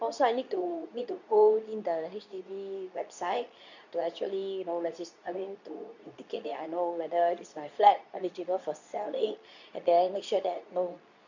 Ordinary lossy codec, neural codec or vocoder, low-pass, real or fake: none; codec, 24 kHz, 0.9 kbps, WavTokenizer, medium speech release version 2; 7.2 kHz; fake